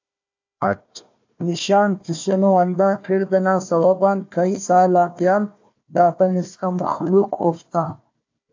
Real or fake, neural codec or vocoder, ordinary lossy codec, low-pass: fake; codec, 16 kHz, 1 kbps, FunCodec, trained on Chinese and English, 50 frames a second; AAC, 48 kbps; 7.2 kHz